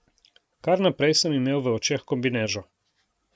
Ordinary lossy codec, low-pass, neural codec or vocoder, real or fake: none; none; none; real